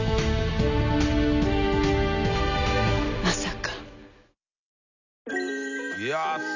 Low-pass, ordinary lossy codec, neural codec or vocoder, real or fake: 7.2 kHz; none; none; real